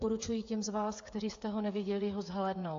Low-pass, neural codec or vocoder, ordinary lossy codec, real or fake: 7.2 kHz; codec, 16 kHz, 8 kbps, FreqCodec, smaller model; AAC, 48 kbps; fake